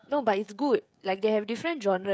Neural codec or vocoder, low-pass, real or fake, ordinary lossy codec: codec, 16 kHz, 16 kbps, FreqCodec, smaller model; none; fake; none